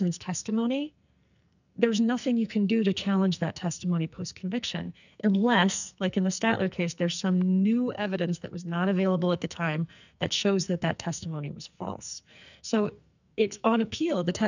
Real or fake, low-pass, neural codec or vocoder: fake; 7.2 kHz; codec, 44.1 kHz, 2.6 kbps, SNAC